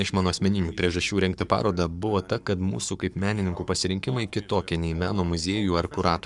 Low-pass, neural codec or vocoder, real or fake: 10.8 kHz; codec, 44.1 kHz, 7.8 kbps, Pupu-Codec; fake